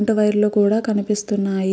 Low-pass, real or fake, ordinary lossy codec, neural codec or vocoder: none; real; none; none